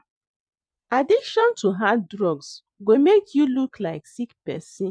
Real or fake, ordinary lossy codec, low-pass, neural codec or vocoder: fake; none; 9.9 kHz; vocoder, 24 kHz, 100 mel bands, Vocos